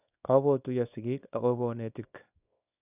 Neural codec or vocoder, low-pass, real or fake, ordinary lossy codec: codec, 24 kHz, 0.9 kbps, WavTokenizer, small release; 3.6 kHz; fake; none